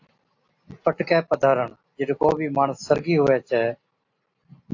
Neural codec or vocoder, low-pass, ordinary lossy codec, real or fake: none; 7.2 kHz; AAC, 48 kbps; real